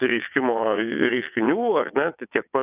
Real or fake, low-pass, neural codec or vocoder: fake; 3.6 kHz; vocoder, 22.05 kHz, 80 mel bands, WaveNeXt